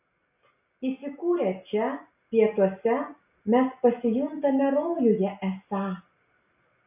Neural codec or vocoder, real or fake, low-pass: none; real; 3.6 kHz